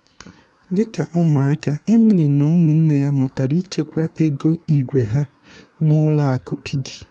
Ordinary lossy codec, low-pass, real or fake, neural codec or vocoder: none; 10.8 kHz; fake; codec, 24 kHz, 1 kbps, SNAC